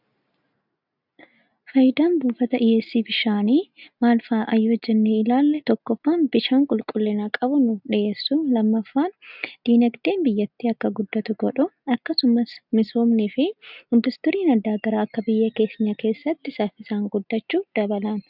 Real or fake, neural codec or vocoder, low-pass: real; none; 5.4 kHz